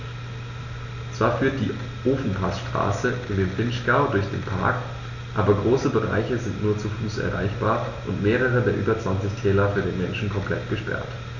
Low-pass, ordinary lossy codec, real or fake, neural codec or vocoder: 7.2 kHz; none; real; none